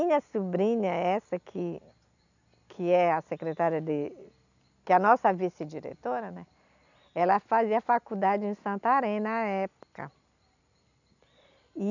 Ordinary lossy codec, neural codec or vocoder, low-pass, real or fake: none; none; 7.2 kHz; real